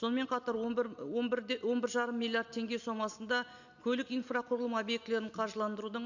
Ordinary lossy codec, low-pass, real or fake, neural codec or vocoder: none; 7.2 kHz; real; none